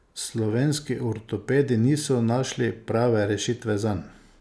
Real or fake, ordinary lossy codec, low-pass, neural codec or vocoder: real; none; none; none